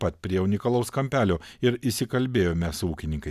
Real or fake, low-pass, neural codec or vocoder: real; 14.4 kHz; none